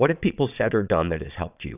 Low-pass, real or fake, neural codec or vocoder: 3.6 kHz; fake; vocoder, 22.05 kHz, 80 mel bands, Vocos